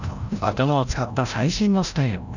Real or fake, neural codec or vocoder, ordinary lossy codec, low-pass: fake; codec, 16 kHz, 0.5 kbps, FreqCodec, larger model; none; 7.2 kHz